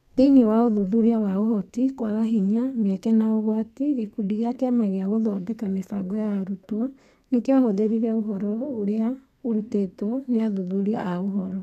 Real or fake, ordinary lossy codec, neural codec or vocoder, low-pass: fake; none; codec, 32 kHz, 1.9 kbps, SNAC; 14.4 kHz